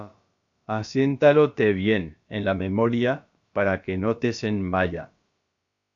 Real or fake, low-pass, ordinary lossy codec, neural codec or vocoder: fake; 7.2 kHz; AAC, 64 kbps; codec, 16 kHz, about 1 kbps, DyCAST, with the encoder's durations